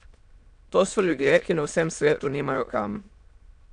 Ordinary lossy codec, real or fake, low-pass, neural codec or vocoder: none; fake; 9.9 kHz; autoencoder, 22.05 kHz, a latent of 192 numbers a frame, VITS, trained on many speakers